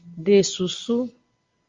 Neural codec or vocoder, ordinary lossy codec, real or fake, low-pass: none; Opus, 32 kbps; real; 7.2 kHz